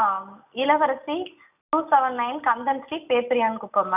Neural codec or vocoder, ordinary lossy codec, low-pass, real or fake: none; none; 3.6 kHz; real